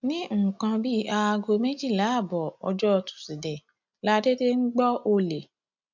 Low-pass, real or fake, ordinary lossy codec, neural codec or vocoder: 7.2 kHz; real; none; none